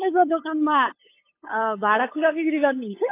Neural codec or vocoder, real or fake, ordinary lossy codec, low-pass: codec, 16 kHz, 8 kbps, FunCodec, trained on LibriTTS, 25 frames a second; fake; AAC, 24 kbps; 3.6 kHz